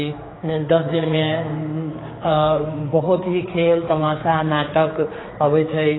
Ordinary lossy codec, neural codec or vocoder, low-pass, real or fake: AAC, 16 kbps; codec, 16 kHz, 4 kbps, X-Codec, HuBERT features, trained on general audio; 7.2 kHz; fake